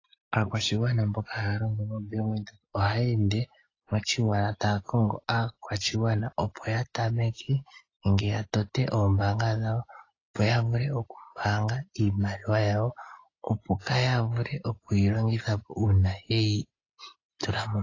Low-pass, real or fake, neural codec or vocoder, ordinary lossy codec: 7.2 kHz; real; none; AAC, 32 kbps